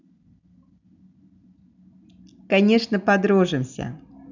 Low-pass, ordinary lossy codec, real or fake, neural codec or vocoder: 7.2 kHz; none; real; none